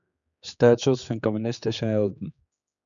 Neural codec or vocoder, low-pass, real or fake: codec, 16 kHz, 4 kbps, X-Codec, HuBERT features, trained on general audio; 7.2 kHz; fake